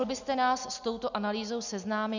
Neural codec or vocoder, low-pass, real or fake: none; 7.2 kHz; real